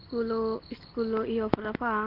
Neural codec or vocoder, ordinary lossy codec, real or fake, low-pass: none; Opus, 16 kbps; real; 5.4 kHz